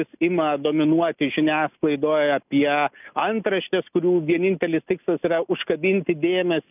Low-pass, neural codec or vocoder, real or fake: 3.6 kHz; none; real